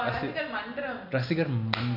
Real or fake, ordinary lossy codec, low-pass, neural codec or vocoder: real; none; 5.4 kHz; none